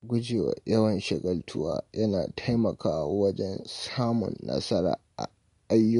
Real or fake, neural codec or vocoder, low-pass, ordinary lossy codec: real; none; 10.8 kHz; MP3, 64 kbps